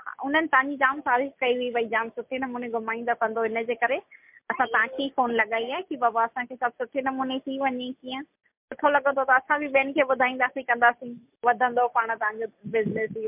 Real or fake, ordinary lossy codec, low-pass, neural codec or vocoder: real; MP3, 32 kbps; 3.6 kHz; none